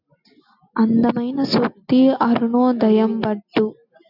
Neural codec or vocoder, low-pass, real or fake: none; 5.4 kHz; real